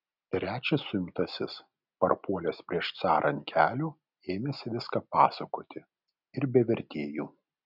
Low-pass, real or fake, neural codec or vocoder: 5.4 kHz; real; none